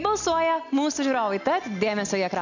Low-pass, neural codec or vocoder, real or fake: 7.2 kHz; none; real